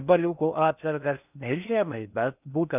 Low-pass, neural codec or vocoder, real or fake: 3.6 kHz; codec, 16 kHz in and 24 kHz out, 0.6 kbps, FocalCodec, streaming, 4096 codes; fake